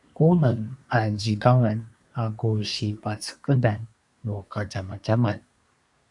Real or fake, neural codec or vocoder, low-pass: fake; codec, 24 kHz, 1 kbps, SNAC; 10.8 kHz